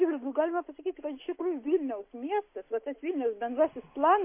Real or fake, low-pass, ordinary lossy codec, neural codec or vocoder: real; 3.6 kHz; MP3, 24 kbps; none